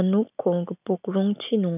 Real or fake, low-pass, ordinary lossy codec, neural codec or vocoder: real; 3.6 kHz; none; none